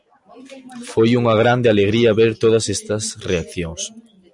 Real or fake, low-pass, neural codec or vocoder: real; 10.8 kHz; none